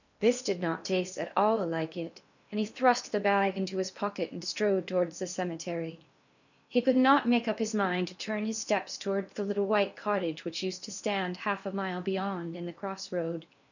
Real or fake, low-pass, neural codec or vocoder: fake; 7.2 kHz; codec, 16 kHz in and 24 kHz out, 0.8 kbps, FocalCodec, streaming, 65536 codes